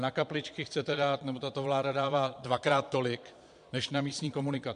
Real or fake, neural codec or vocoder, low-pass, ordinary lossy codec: fake; vocoder, 22.05 kHz, 80 mel bands, Vocos; 9.9 kHz; MP3, 64 kbps